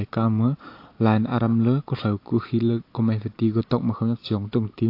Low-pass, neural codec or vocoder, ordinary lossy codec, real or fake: 5.4 kHz; none; AAC, 32 kbps; real